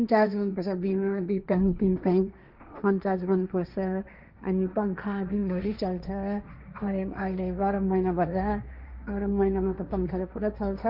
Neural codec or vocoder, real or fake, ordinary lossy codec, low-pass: codec, 16 kHz, 1.1 kbps, Voila-Tokenizer; fake; none; 5.4 kHz